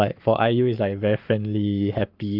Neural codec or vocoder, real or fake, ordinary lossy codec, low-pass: codec, 44.1 kHz, 7.8 kbps, Pupu-Codec; fake; Opus, 24 kbps; 5.4 kHz